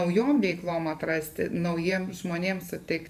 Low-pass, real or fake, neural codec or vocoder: 14.4 kHz; fake; vocoder, 44.1 kHz, 128 mel bands every 512 samples, BigVGAN v2